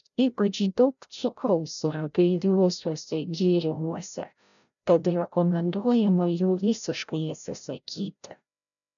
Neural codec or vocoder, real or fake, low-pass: codec, 16 kHz, 0.5 kbps, FreqCodec, larger model; fake; 7.2 kHz